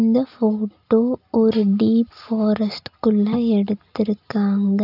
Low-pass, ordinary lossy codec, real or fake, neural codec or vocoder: 5.4 kHz; none; real; none